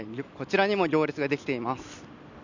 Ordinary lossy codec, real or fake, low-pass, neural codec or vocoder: none; real; 7.2 kHz; none